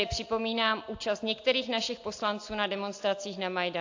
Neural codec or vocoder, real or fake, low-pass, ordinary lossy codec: none; real; 7.2 kHz; AAC, 48 kbps